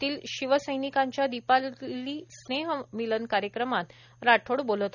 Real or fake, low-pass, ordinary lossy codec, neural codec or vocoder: real; 7.2 kHz; none; none